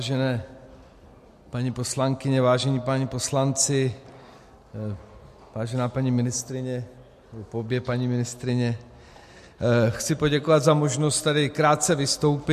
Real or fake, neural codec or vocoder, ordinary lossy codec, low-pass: real; none; MP3, 64 kbps; 14.4 kHz